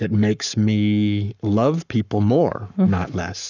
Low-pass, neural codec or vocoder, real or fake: 7.2 kHz; codec, 44.1 kHz, 7.8 kbps, Pupu-Codec; fake